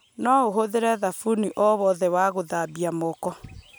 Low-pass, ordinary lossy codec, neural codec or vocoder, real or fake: none; none; none; real